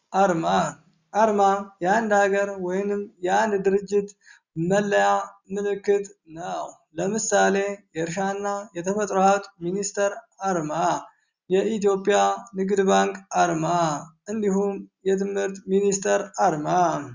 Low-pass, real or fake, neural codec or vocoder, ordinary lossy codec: 7.2 kHz; real; none; Opus, 64 kbps